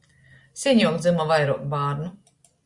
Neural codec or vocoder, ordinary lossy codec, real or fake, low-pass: none; Opus, 64 kbps; real; 10.8 kHz